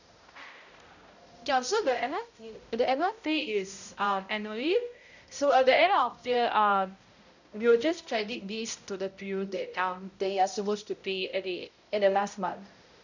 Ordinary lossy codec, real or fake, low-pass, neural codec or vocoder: none; fake; 7.2 kHz; codec, 16 kHz, 0.5 kbps, X-Codec, HuBERT features, trained on balanced general audio